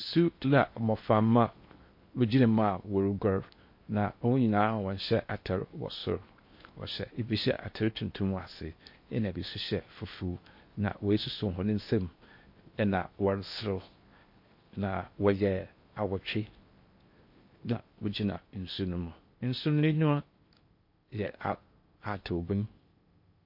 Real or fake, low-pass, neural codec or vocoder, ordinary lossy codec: fake; 5.4 kHz; codec, 16 kHz in and 24 kHz out, 0.8 kbps, FocalCodec, streaming, 65536 codes; MP3, 32 kbps